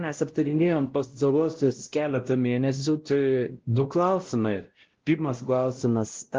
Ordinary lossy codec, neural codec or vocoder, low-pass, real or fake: Opus, 16 kbps; codec, 16 kHz, 0.5 kbps, X-Codec, WavLM features, trained on Multilingual LibriSpeech; 7.2 kHz; fake